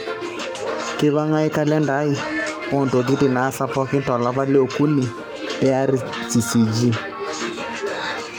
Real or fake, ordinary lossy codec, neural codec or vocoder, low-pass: fake; none; codec, 44.1 kHz, 7.8 kbps, Pupu-Codec; none